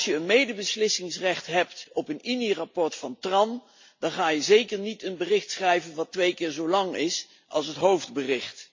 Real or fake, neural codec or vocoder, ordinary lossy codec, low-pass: real; none; MP3, 48 kbps; 7.2 kHz